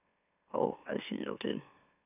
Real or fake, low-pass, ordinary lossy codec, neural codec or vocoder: fake; 3.6 kHz; none; autoencoder, 44.1 kHz, a latent of 192 numbers a frame, MeloTTS